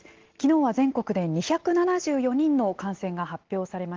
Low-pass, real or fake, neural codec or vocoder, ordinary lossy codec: 7.2 kHz; real; none; Opus, 16 kbps